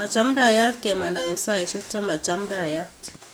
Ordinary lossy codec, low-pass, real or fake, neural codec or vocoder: none; none; fake; codec, 44.1 kHz, 2.6 kbps, DAC